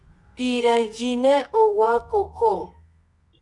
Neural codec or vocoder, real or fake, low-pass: codec, 24 kHz, 0.9 kbps, WavTokenizer, medium music audio release; fake; 10.8 kHz